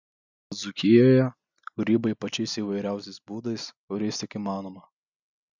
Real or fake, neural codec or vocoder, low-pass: real; none; 7.2 kHz